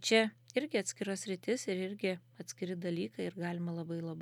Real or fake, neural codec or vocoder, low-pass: real; none; 19.8 kHz